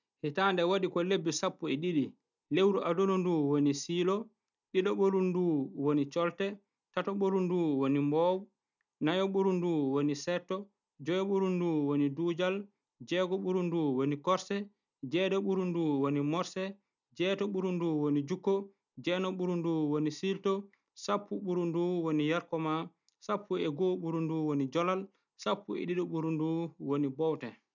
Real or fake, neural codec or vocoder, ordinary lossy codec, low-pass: real; none; none; 7.2 kHz